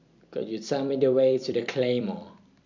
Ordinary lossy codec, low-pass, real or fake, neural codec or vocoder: MP3, 64 kbps; 7.2 kHz; fake; vocoder, 44.1 kHz, 128 mel bands every 256 samples, BigVGAN v2